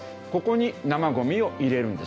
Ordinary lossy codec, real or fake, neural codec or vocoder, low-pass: none; real; none; none